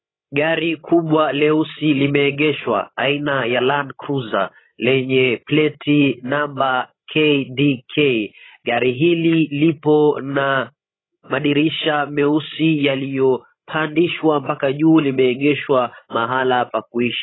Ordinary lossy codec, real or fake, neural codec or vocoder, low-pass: AAC, 16 kbps; fake; codec, 16 kHz, 16 kbps, FreqCodec, larger model; 7.2 kHz